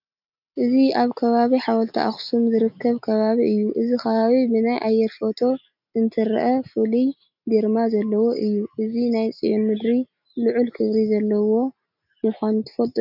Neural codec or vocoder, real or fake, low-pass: none; real; 5.4 kHz